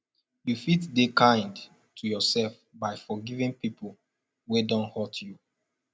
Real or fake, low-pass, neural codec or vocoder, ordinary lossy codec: real; none; none; none